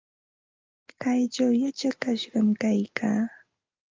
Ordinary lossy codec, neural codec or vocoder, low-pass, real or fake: Opus, 24 kbps; none; 7.2 kHz; real